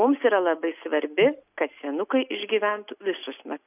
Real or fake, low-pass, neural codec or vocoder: real; 3.6 kHz; none